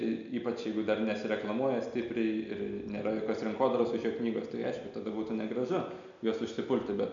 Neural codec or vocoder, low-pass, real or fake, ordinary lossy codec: none; 7.2 kHz; real; MP3, 64 kbps